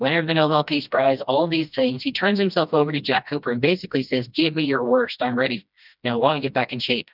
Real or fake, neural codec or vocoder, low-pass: fake; codec, 16 kHz, 1 kbps, FreqCodec, smaller model; 5.4 kHz